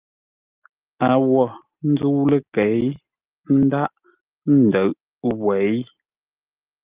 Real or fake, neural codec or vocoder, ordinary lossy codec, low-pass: real; none; Opus, 24 kbps; 3.6 kHz